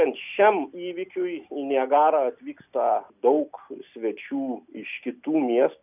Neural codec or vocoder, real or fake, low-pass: none; real; 3.6 kHz